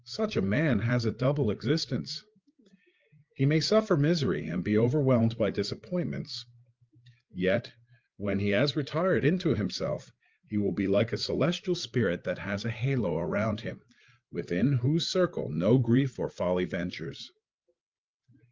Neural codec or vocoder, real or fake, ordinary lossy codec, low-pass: codec, 16 kHz, 8 kbps, FreqCodec, larger model; fake; Opus, 24 kbps; 7.2 kHz